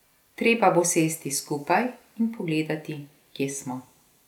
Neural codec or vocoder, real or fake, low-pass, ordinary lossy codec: none; real; 19.8 kHz; none